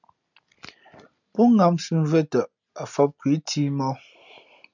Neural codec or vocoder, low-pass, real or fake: none; 7.2 kHz; real